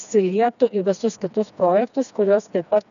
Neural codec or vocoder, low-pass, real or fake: codec, 16 kHz, 2 kbps, FreqCodec, smaller model; 7.2 kHz; fake